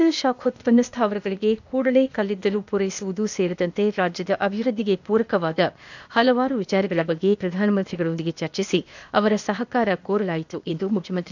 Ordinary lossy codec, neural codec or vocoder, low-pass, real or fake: none; codec, 16 kHz, 0.8 kbps, ZipCodec; 7.2 kHz; fake